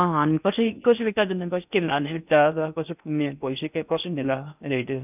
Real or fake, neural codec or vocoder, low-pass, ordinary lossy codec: fake; codec, 16 kHz in and 24 kHz out, 0.6 kbps, FocalCodec, streaming, 4096 codes; 3.6 kHz; none